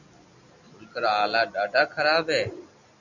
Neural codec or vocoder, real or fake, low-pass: none; real; 7.2 kHz